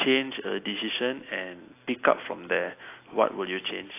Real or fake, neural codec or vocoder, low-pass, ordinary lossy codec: real; none; 3.6 kHz; AAC, 24 kbps